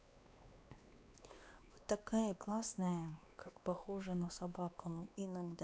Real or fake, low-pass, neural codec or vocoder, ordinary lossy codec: fake; none; codec, 16 kHz, 2 kbps, X-Codec, WavLM features, trained on Multilingual LibriSpeech; none